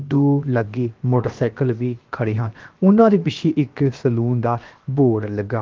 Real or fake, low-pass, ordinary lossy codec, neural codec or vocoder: fake; 7.2 kHz; Opus, 32 kbps; codec, 16 kHz, 0.7 kbps, FocalCodec